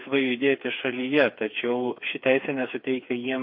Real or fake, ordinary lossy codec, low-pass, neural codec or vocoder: fake; MP3, 32 kbps; 5.4 kHz; codec, 16 kHz, 4 kbps, FreqCodec, smaller model